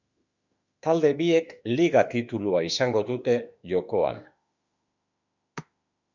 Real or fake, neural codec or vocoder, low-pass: fake; autoencoder, 48 kHz, 32 numbers a frame, DAC-VAE, trained on Japanese speech; 7.2 kHz